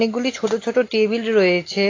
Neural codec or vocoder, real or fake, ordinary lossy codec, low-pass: none; real; AAC, 32 kbps; 7.2 kHz